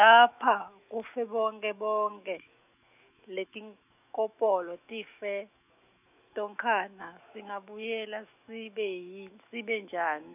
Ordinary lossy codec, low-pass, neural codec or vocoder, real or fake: none; 3.6 kHz; autoencoder, 48 kHz, 128 numbers a frame, DAC-VAE, trained on Japanese speech; fake